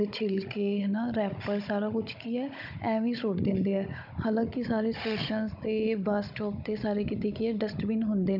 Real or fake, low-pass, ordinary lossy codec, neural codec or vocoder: fake; 5.4 kHz; none; codec, 16 kHz, 16 kbps, FunCodec, trained on Chinese and English, 50 frames a second